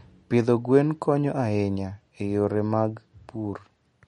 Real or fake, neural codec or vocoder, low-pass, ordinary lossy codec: real; none; 19.8 kHz; MP3, 48 kbps